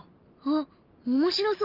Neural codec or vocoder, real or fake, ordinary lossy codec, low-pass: none; real; Opus, 32 kbps; 5.4 kHz